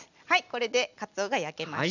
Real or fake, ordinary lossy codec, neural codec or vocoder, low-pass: real; none; none; 7.2 kHz